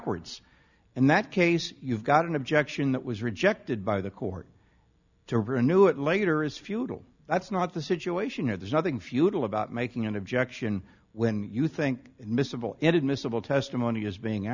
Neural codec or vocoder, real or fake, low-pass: none; real; 7.2 kHz